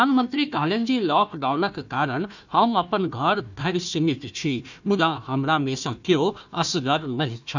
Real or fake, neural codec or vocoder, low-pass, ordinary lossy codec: fake; codec, 16 kHz, 1 kbps, FunCodec, trained on Chinese and English, 50 frames a second; 7.2 kHz; none